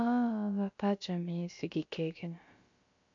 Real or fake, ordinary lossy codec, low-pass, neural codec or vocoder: fake; MP3, 48 kbps; 7.2 kHz; codec, 16 kHz, about 1 kbps, DyCAST, with the encoder's durations